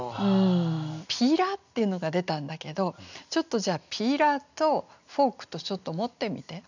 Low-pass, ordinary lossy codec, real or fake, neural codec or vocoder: 7.2 kHz; none; real; none